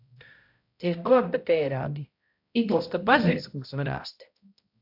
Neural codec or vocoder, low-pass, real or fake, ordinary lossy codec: codec, 16 kHz, 0.5 kbps, X-Codec, HuBERT features, trained on balanced general audio; 5.4 kHz; fake; AAC, 48 kbps